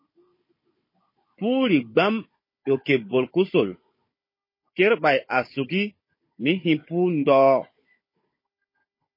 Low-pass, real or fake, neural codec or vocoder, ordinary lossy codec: 5.4 kHz; fake; codec, 16 kHz, 16 kbps, FunCodec, trained on Chinese and English, 50 frames a second; MP3, 24 kbps